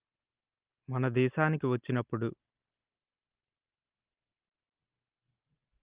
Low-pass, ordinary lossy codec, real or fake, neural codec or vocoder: 3.6 kHz; Opus, 24 kbps; real; none